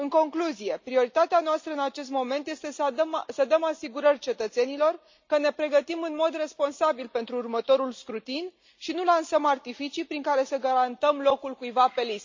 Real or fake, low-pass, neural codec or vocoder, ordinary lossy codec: real; 7.2 kHz; none; MP3, 64 kbps